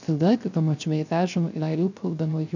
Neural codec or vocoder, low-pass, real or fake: codec, 16 kHz, 0.3 kbps, FocalCodec; 7.2 kHz; fake